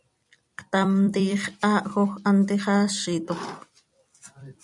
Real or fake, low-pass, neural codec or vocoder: fake; 10.8 kHz; vocoder, 44.1 kHz, 128 mel bands every 256 samples, BigVGAN v2